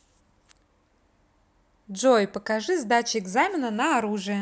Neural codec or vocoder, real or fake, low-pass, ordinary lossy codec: none; real; none; none